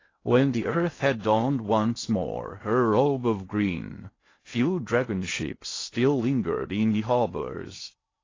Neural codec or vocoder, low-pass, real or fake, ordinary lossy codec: codec, 16 kHz in and 24 kHz out, 0.6 kbps, FocalCodec, streaming, 4096 codes; 7.2 kHz; fake; AAC, 32 kbps